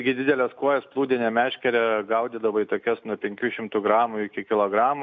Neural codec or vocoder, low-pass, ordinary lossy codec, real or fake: none; 7.2 kHz; MP3, 64 kbps; real